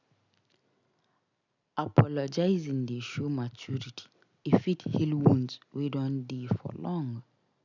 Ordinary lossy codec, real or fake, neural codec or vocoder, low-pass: none; real; none; 7.2 kHz